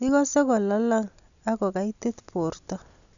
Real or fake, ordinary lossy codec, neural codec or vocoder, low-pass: real; none; none; 7.2 kHz